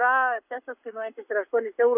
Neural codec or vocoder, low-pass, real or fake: codec, 44.1 kHz, 7.8 kbps, Pupu-Codec; 3.6 kHz; fake